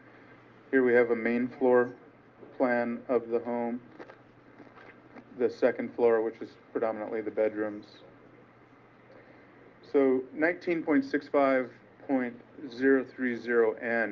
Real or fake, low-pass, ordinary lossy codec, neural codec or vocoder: real; 7.2 kHz; Opus, 32 kbps; none